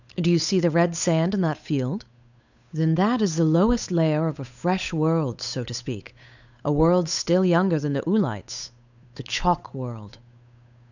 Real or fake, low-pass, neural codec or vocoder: fake; 7.2 kHz; codec, 16 kHz, 8 kbps, FunCodec, trained on Chinese and English, 25 frames a second